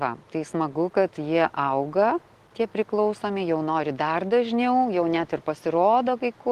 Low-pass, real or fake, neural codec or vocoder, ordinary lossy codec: 14.4 kHz; real; none; Opus, 16 kbps